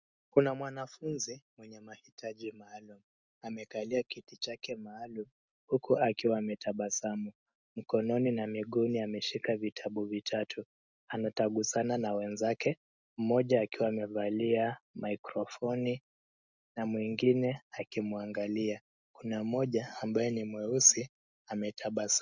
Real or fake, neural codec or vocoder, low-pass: real; none; 7.2 kHz